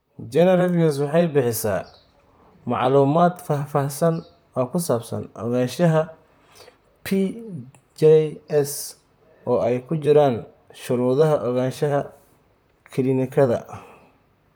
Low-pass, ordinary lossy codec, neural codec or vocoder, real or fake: none; none; vocoder, 44.1 kHz, 128 mel bands, Pupu-Vocoder; fake